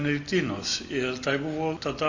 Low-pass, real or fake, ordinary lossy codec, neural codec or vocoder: 7.2 kHz; real; Opus, 64 kbps; none